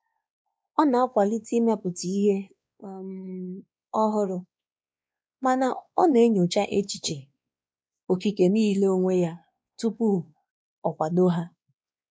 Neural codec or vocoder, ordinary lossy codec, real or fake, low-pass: codec, 16 kHz, 2 kbps, X-Codec, WavLM features, trained on Multilingual LibriSpeech; none; fake; none